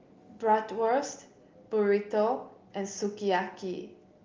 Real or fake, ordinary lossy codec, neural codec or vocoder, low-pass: real; Opus, 32 kbps; none; 7.2 kHz